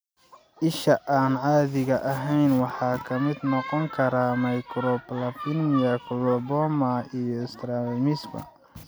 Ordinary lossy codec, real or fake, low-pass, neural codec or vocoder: none; real; none; none